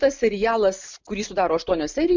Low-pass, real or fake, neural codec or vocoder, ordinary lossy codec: 7.2 kHz; real; none; MP3, 64 kbps